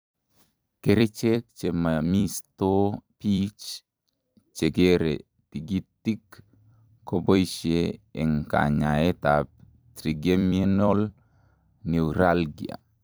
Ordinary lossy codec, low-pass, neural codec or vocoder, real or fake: none; none; vocoder, 44.1 kHz, 128 mel bands every 256 samples, BigVGAN v2; fake